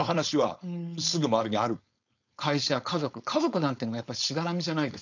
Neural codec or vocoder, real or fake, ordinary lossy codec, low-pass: codec, 16 kHz, 4.8 kbps, FACodec; fake; none; 7.2 kHz